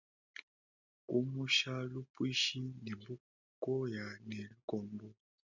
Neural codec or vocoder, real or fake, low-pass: none; real; 7.2 kHz